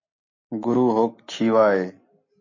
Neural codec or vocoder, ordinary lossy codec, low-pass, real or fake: none; MP3, 32 kbps; 7.2 kHz; real